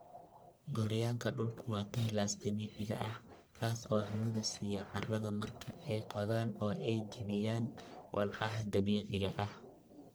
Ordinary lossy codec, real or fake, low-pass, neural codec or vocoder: none; fake; none; codec, 44.1 kHz, 1.7 kbps, Pupu-Codec